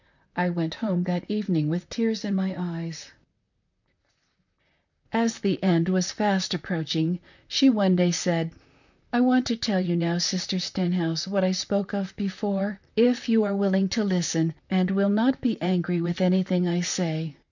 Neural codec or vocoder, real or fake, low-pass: vocoder, 44.1 kHz, 128 mel bands, Pupu-Vocoder; fake; 7.2 kHz